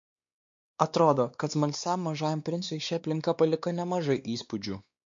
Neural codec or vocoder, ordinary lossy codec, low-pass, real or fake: codec, 16 kHz, 2 kbps, X-Codec, WavLM features, trained on Multilingual LibriSpeech; MP3, 48 kbps; 7.2 kHz; fake